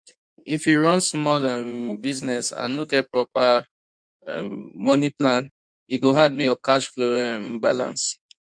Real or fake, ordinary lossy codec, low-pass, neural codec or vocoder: fake; MP3, 96 kbps; 9.9 kHz; codec, 16 kHz in and 24 kHz out, 1.1 kbps, FireRedTTS-2 codec